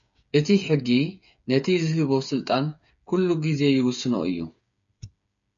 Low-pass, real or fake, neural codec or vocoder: 7.2 kHz; fake; codec, 16 kHz, 8 kbps, FreqCodec, smaller model